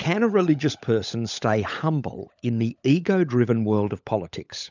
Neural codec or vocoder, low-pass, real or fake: codec, 16 kHz, 16 kbps, FunCodec, trained on LibriTTS, 50 frames a second; 7.2 kHz; fake